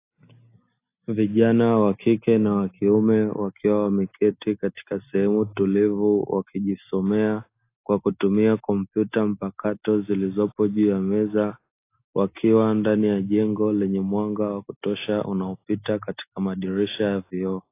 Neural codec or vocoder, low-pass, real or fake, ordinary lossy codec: none; 3.6 kHz; real; AAC, 24 kbps